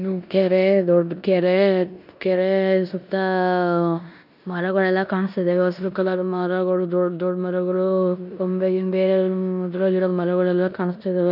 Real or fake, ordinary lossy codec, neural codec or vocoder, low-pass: fake; none; codec, 16 kHz in and 24 kHz out, 0.9 kbps, LongCat-Audio-Codec, four codebook decoder; 5.4 kHz